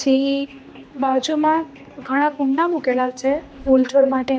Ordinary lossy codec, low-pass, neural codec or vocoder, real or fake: none; none; codec, 16 kHz, 2 kbps, X-Codec, HuBERT features, trained on general audio; fake